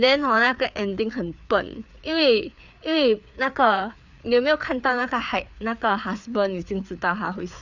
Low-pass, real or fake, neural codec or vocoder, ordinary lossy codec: 7.2 kHz; fake; codec, 16 kHz, 4 kbps, FreqCodec, larger model; none